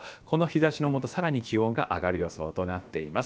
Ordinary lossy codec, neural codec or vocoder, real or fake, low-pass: none; codec, 16 kHz, about 1 kbps, DyCAST, with the encoder's durations; fake; none